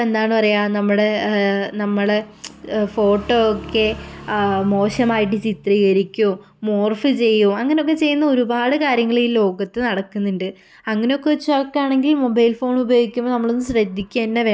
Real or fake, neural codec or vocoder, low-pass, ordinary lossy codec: real; none; none; none